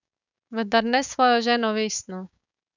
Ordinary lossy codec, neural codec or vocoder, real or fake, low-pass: none; codec, 16 kHz, 6 kbps, DAC; fake; 7.2 kHz